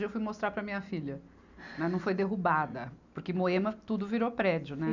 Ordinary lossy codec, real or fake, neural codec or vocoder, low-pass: none; real; none; 7.2 kHz